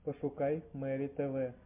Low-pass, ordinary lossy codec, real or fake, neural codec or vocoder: 3.6 kHz; MP3, 32 kbps; real; none